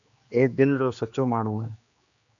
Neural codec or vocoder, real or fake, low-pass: codec, 16 kHz, 2 kbps, X-Codec, HuBERT features, trained on general audio; fake; 7.2 kHz